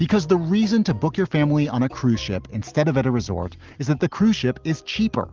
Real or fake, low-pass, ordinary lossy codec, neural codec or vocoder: real; 7.2 kHz; Opus, 32 kbps; none